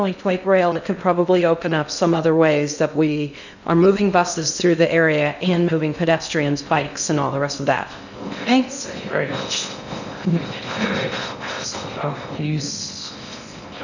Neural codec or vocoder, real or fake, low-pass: codec, 16 kHz in and 24 kHz out, 0.6 kbps, FocalCodec, streaming, 2048 codes; fake; 7.2 kHz